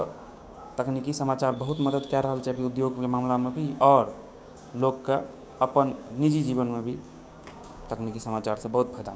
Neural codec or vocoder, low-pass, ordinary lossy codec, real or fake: codec, 16 kHz, 6 kbps, DAC; none; none; fake